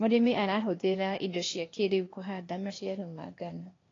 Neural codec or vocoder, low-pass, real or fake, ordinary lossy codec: codec, 16 kHz, 0.8 kbps, ZipCodec; 7.2 kHz; fake; AAC, 32 kbps